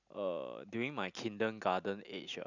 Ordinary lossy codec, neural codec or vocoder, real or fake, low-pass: none; none; real; 7.2 kHz